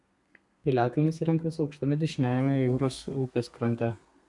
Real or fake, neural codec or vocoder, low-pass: fake; codec, 32 kHz, 1.9 kbps, SNAC; 10.8 kHz